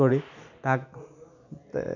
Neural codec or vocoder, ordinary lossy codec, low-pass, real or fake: none; none; 7.2 kHz; real